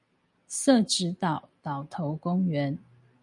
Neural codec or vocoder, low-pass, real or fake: none; 10.8 kHz; real